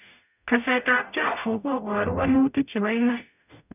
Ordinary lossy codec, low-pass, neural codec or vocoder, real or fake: none; 3.6 kHz; codec, 44.1 kHz, 0.9 kbps, DAC; fake